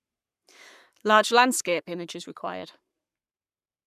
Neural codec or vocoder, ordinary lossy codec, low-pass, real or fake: codec, 44.1 kHz, 7.8 kbps, Pupu-Codec; none; 14.4 kHz; fake